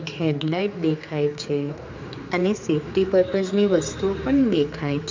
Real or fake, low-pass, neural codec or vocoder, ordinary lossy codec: fake; 7.2 kHz; codec, 16 kHz, 4 kbps, X-Codec, HuBERT features, trained on general audio; MP3, 64 kbps